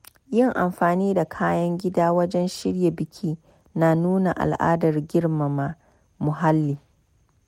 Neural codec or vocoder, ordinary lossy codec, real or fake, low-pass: none; MP3, 64 kbps; real; 19.8 kHz